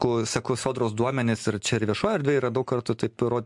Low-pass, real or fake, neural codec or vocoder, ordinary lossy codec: 10.8 kHz; real; none; MP3, 64 kbps